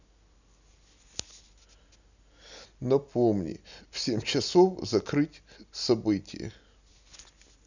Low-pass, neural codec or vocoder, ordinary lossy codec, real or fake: 7.2 kHz; none; none; real